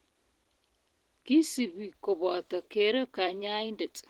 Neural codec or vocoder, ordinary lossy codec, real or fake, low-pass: vocoder, 44.1 kHz, 128 mel bands every 512 samples, BigVGAN v2; Opus, 16 kbps; fake; 19.8 kHz